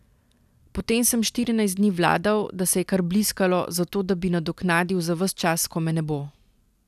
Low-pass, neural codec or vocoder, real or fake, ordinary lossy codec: 14.4 kHz; none; real; none